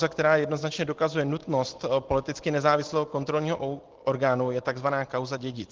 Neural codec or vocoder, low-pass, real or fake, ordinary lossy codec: none; 7.2 kHz; real; Opus, 16 kbps